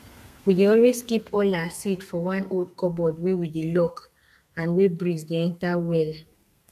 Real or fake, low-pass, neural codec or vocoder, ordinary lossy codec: fake; 14.4 kHz; codec, 32 kHz, 1.9 kbps, SNAC; none